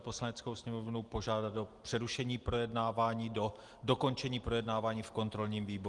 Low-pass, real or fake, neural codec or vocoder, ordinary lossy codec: 10.8 kHz; real; none; Opus, 24 kbps